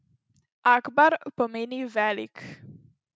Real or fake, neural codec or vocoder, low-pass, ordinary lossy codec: real; none; none; none